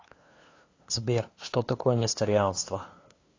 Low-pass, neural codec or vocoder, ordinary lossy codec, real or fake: 7.2 kHz; codec, 16 kHz, 2 kbps, FunCodec, trained on LibriTTS, 25 frames a second; AAC, 32 kbps; fake